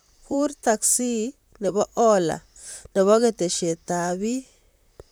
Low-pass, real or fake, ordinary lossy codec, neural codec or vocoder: none; fake; none; vocoder, 44.1 kHz, 128 mel bands, Pupu-Vocoder